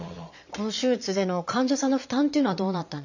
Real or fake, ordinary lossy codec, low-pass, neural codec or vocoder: fake; none; 7.2 kHz; vocoder, 22.05 kHz, 80 mel bands, Vocos